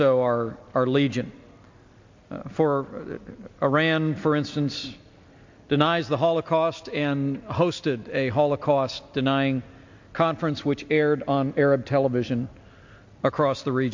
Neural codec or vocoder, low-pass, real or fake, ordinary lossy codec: none; 7.2 kHz; real; MP3, 48 kbps